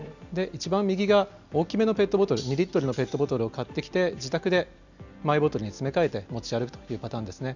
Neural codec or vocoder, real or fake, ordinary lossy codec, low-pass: none; real; none; 7.2 kHz